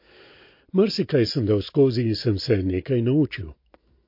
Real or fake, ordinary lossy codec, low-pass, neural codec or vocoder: real; MP3, 32 kbps; 5.4 kHz; none